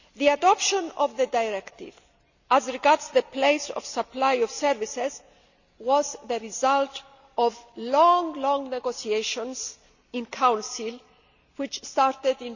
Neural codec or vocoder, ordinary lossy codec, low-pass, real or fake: none; none; 7.2 kHz; real